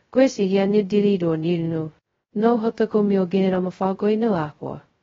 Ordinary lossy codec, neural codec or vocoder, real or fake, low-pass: AAC, 24 kbps; codec, 16 kHz, 0.2 kbps, FocalCodec; fake; 7.2 kHz